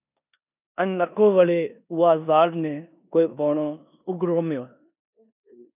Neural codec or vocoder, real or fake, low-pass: codec, 16 kHz in and 24 kHz out, 0.9 kbps, LongCat-Audio-Codec, four codebook decoder; fake; 3.6 kHz